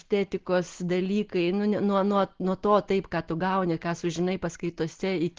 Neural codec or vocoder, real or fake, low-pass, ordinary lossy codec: none; real; 7.2 kHz; Opus, 16 kbps